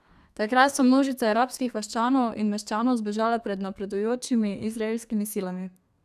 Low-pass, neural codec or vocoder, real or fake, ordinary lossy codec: 14.4 kHz; codec, 32 kHz, 1.9 kbps, SNAC; fake; none